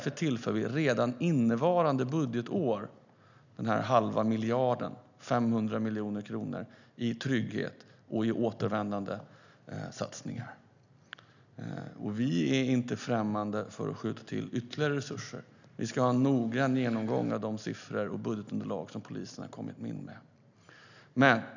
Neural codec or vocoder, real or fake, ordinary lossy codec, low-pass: none; real; none; 7.2 kHz